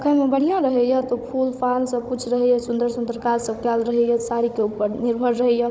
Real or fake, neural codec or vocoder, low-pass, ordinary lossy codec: fake; codec, 16 kHz, 16 kbps, FunCodec, trained on Chinese and English, 50 frames a second; none; none